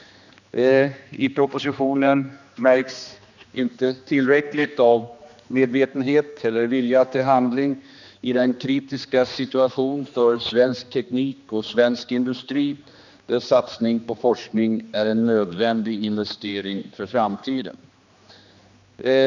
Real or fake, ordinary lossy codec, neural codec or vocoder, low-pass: fake; none; codec, 16 kHz, 2 kbps, X-Codec, HuBERT features, trained on general audio; 7.2 kHz